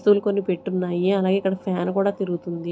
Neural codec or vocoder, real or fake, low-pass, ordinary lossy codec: none; real; none; none